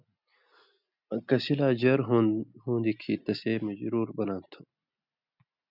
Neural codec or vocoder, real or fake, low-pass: none; real; 5.4 kHz